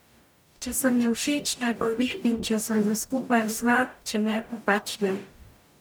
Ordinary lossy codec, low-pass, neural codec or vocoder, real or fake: none; none; codec, 44.1 kHz, 0.9 kbps, DAC; fake